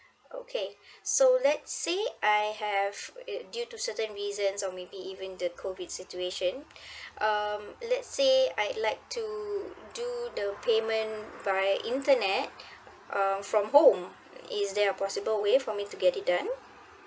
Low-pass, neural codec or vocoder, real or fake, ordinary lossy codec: none; none; real; none